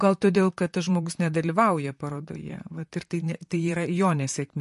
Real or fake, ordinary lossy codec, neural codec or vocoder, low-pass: fake; MP3, 48 kbps; vocoder, 44.1 kHz, 128 mel bands, Pupu-Vocoder; 14.4 kHz